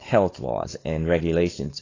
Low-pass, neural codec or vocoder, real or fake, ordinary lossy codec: 7.2 kHz; codec, 16 kHz, 4.8 kbps, FACodec; fake; AAC, 32 kbps